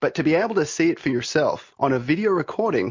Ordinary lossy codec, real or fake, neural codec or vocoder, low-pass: MP3, 48 kbps; real; none; 7.2 kHz